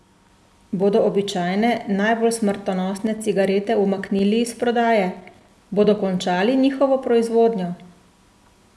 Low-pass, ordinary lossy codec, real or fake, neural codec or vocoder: none; none; real; none